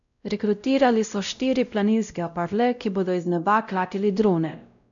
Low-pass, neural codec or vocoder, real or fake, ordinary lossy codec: 7.2 kHz; codec, 16 kHz, 0.5 kbps, X-Codec, WavLM features, trained on Multilingual LibriSpeech; fake; none